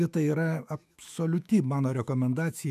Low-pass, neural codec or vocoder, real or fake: 14.4 kHz; autoencoder, 48 kHz, 128 numbers a frame, DAC-VAE, trained on Japanese speech; fake